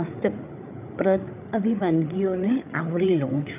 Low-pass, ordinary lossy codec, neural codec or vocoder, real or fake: 3.6 kHz; none; codec, 16 kHz, 8 kbps, FreqCodec, larger model; fake